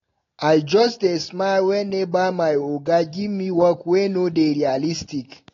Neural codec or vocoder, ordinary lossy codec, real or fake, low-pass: none; AAC, 32 kbps; real; 7.2 kHz